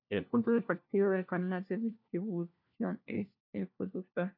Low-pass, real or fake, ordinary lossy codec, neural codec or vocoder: 5.4 kHz; fake; AAC, 48 kbps; codec, 16 kHz, 1 kbps, FunCodec, trained on LibriTTS, 50 frames a second